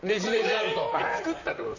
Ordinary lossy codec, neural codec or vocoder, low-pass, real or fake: none; vocoder, 44.1 kHz, 128 mel bands, Pupu-Vocoder; 7.2 kHz; fake